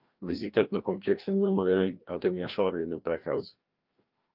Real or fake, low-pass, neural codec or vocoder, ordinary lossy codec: fake; 5.4 kHz; codec, 16 kHz, 1 kbps, FreqCodec, larger model; Opus, 32 kbps